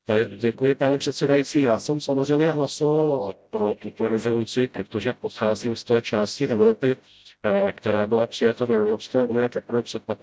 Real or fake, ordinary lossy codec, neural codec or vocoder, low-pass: fake; none; codec, 16 kHz, 0.5 kbps, FreqCodec, smaller model; none